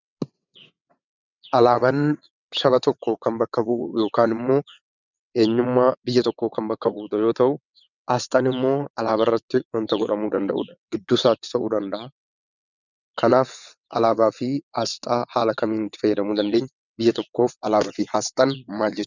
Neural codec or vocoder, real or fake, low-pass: vocoder, 22.05 kHz, 80 mel bands, WaveNeXt; fake; 7.2 kHz